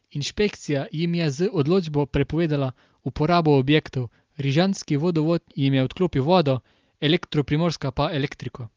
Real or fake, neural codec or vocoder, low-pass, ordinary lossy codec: real; none; 7.2 kHz; Opus, 32 kbps